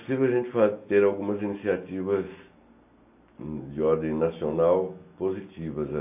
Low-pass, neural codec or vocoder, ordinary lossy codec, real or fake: 3.6 kHz; none; none; real